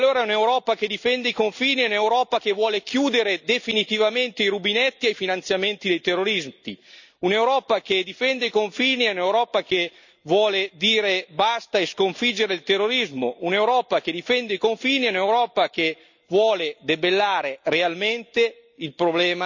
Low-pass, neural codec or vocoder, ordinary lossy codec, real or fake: 7.2 kHz; none; none; real